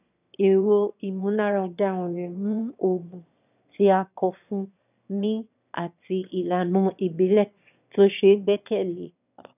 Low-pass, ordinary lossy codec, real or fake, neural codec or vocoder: 3.6 kHz; none; fake; autoencoder, 22.05 kHz, a latent of 192 numbers a frame, VITS, trained on one speaker